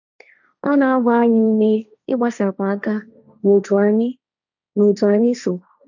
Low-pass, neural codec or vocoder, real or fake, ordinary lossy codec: 7.2 kHz; codec, 16 kHz, 1.1 kbps, Voila-Tokenizer; fake; none